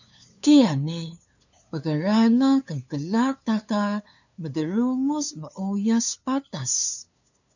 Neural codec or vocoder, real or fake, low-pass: codec, 16 kHz, 2 kbps, FunCodec, trained on LibriTTS, 25 frames a second; fake; 7.2 kHz